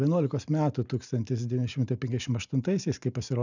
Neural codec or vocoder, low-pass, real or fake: none; 7.2 kHz; real